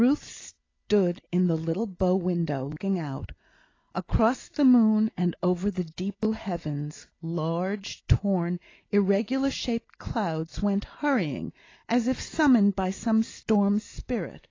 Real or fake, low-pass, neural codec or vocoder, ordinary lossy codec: real; 7.2 kHz; none; AAC, 32 kbps